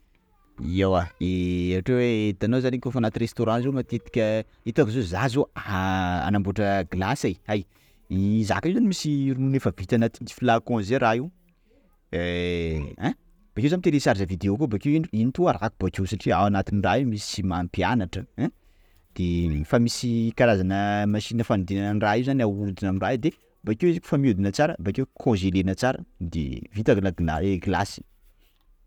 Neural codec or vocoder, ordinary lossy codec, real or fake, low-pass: none; none; real; 19.8 kHz